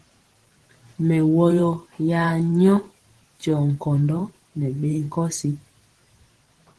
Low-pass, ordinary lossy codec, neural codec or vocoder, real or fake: 10.8 kHz; Opus, 16 kbps; vocoder, 44.1 kHz, 128 mel bands every 512 samples, BigVGAN v2; fake